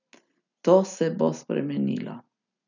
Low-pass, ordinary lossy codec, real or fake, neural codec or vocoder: 7.2 kHz; none; real; none